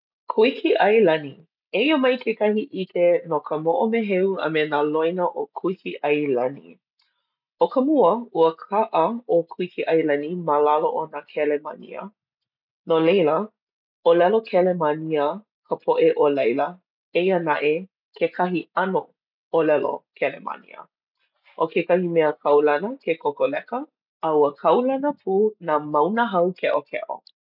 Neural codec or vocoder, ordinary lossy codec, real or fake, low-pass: none; none; real; 5.4 kHz